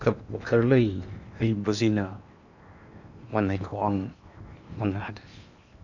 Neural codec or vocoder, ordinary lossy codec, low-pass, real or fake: codec, 16 kHz in and 24 kHz out, 0.8 kbps, FocalCodec, streaming, 65536 codes; none; 7.2 kHz; fake